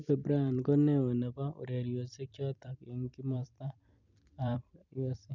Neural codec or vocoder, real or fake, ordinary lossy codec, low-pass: vocoder, 44.1 kHz, 128 mel bands every 256 samples, BigVGAN v2; fake; none; 7.2 kHz